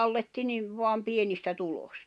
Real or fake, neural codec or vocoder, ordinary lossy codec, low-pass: real; none; none; none